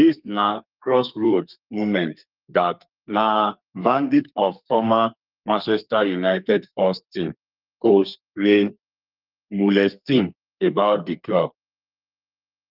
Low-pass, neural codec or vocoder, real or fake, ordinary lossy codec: 5.4 kHz; codec, 32 kHz, 1.9 kbps, SNAC; fake; Opus, 32 kbps